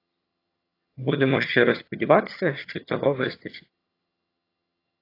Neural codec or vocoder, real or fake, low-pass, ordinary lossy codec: vocoder, 22.05 kHz, 80 mel bands, HiFi-GAN; fake; 5.4 kHz; AAC, 32 kbps